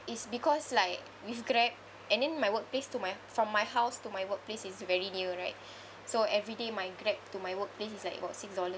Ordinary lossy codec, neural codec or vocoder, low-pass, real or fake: none; none; none; real